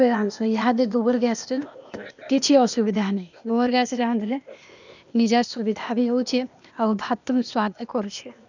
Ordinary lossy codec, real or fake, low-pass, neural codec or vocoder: none; fake; 7.2 kHz; codec, 16 kHz, 0.8 kbps, ZipCodec